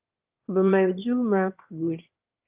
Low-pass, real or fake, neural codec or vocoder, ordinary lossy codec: 3.6 kHz; fake; autoencoder, 22.05 kHz, a latent of 192 numbers a frame, VITS, trained on one speaker; Opus, 24 kbps